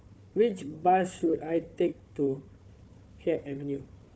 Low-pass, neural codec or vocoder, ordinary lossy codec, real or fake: none; codec, 16 kHz, 4 kbps, FunCodec, trained on Chinese and English, 50 frames a second; none; fake